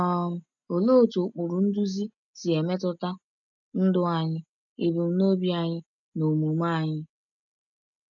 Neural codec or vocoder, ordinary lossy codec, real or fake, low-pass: none; none; real; 7.2 kHz